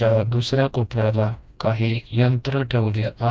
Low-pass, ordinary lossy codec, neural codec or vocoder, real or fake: none; none; codec, 16 kHz, 1 kbps, FreqCodec, smaller model; fake